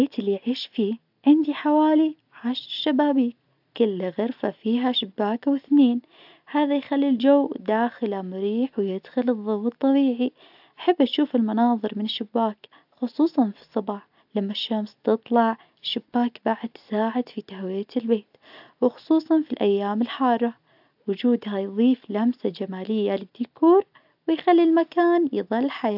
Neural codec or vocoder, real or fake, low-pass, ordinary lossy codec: none; real; 5.4 kHz; AAC, 48 kbps